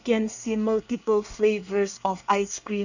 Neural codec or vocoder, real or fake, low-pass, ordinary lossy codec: codec, 24 kHz, 1 kbps, SNAC; fake; 7.2 kHz; none